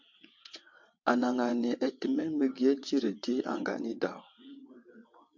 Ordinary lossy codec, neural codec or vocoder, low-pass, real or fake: MP3, 48 kbps; vocoder, 22.05 kHz, 80 mel bands, WaveNeXt; 7.2 kHz; fake